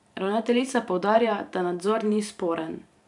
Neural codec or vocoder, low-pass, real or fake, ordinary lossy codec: vocoder, 44.1 kHz, 128 mel bands every 512 samples, BigVGAN v2; 10.8 kHz; fake; none